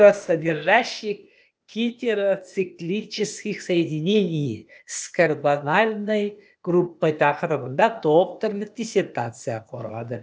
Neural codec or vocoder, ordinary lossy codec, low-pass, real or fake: codec, 16 kHz, 0.8 kbps, ZipCodec; none; none; fake